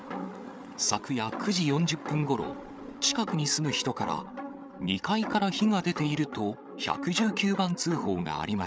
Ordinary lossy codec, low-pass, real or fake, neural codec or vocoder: none; none; fake; codec, 16 kHz, 8 kbps, FreqCodec, larger model